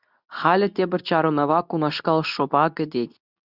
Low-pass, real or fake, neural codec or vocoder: 5.4 kHz; fake; codec, 24 kHz, 0.9 kbps, WavTokenizer, medium speech release version 2